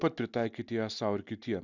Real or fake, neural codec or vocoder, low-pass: real; none; 7.2 kHz